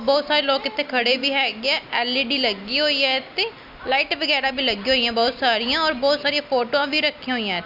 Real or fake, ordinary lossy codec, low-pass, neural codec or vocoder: real; none; 5.4 kHz; none